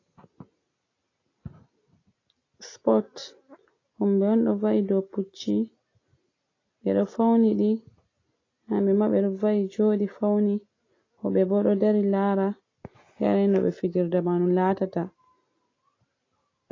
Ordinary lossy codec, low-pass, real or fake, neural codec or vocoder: AAC, 32 kbps; 7.2 kHz; real; none